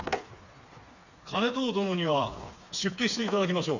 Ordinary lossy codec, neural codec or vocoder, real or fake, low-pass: none; codec, 16 kHz, 4 kbps, FreqCodec, smaller model; fake; 7.2 kHz